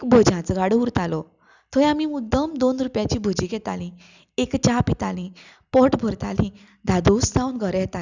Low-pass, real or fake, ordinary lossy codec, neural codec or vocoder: 7.2 kHz; real; none; none